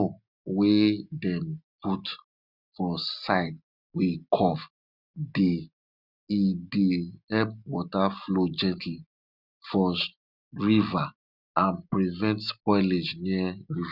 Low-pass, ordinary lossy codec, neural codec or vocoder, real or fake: 5.4 kHz; none; none; real